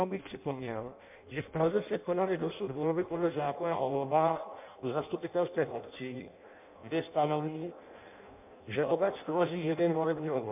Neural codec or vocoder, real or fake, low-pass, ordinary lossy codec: codec, 16 kHz in and 24 kHz out, 0.6 kbps, FireRedTTS-2 codec; fake; 3.6 kHz; MP3, 32 kbps